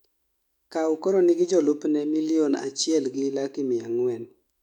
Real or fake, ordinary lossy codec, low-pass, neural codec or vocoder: fake; none; 19.8 kHz; autoencoder, 48 kHz, 128 numbers a frame, DAC-VAE, trained on Japanese speech